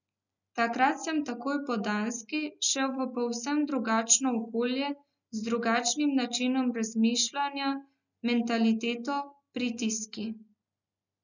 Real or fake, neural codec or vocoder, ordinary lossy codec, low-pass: real; none; none; 7.2 kHz